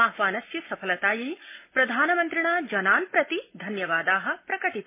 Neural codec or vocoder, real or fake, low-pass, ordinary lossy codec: none; real; 3.6 kHz; MP3, 24 kbps